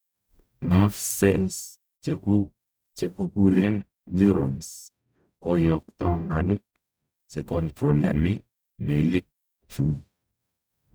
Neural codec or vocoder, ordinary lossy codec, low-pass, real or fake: codec, 44.1 kHz, 0.9 kbps, DAC; none; none; fake